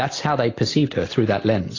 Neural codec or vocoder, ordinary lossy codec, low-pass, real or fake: none; AAC, 32 kbps; 7.2 kHz; real